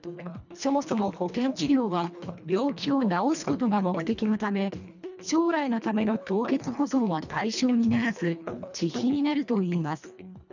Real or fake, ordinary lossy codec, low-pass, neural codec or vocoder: fake; none; 7.2 kHz; codec, 24 kHz, 1.5 kbps, HILCodec